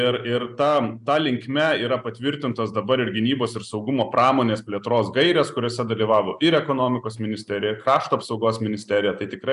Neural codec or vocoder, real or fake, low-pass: none; real; 10.8 kHz